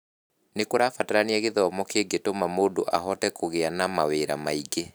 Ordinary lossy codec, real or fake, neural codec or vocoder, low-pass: none; real; none; none